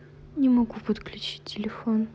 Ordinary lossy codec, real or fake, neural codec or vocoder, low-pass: none; real; none; none